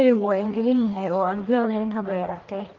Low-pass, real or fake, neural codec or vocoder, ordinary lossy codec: 7.2 kHz; fake; codec, 44.1 kHz, 1.7 kbps, Pupu-Codec; Opus, 32 kbps